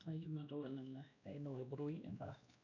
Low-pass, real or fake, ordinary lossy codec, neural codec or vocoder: 7.2 kHz; fake; none; codec, 16 kHz, 1 kbps, X-Codec, WavLM features, trained on Multilingual LibriSpeech